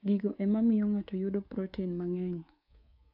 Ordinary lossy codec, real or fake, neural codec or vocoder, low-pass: AAC, 32 kbps; fake; codec, 24 kHz, 3.1 kbps, DualCodec; 5.4 kHz